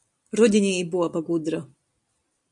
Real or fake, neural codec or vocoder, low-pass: real; none; 10.8 kHz